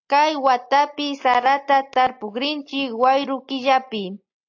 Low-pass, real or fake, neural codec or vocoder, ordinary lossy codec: 7.2 kHz; real; none; AAC, 48 kbps